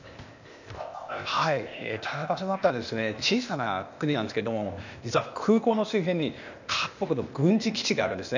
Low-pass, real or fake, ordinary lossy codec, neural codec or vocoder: 7.2 kHz; fake; none; codec, 16 kHz, 0.8 kbps, ZipCodec